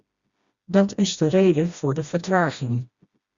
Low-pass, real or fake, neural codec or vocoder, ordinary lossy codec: 7.2 kHz; fake; codec, 16 kHz, 1 kbps, FreqCodec, smaller model; Opus, 64 kbps